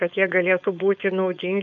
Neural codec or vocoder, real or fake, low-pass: codec, 16 kHz, 4.8 kbps, FACodec; fake; 7.2 kHz